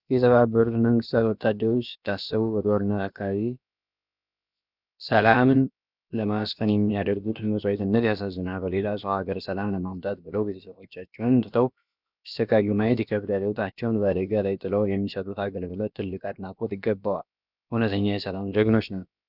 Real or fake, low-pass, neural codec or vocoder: fake; 5.4 kHz; codec, 16 kHz, about 1 kbps, DyCAST, with the encoder's durations